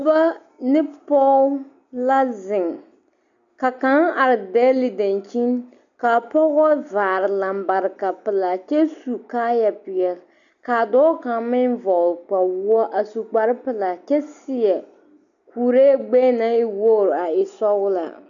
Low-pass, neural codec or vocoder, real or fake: 7.2 kHz; none; real